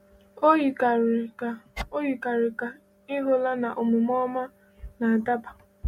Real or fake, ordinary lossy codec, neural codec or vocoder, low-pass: real; MP3, 64 kbps; none; 19.8 kHz